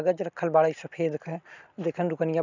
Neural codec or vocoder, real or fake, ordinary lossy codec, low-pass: none; real; none; 7.2 kHz